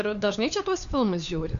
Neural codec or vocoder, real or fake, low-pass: codec, 16 kHz, 4 kbps, FunCodec, trained on LibriTTS, 50 frames a second; fake; 7.2 kHz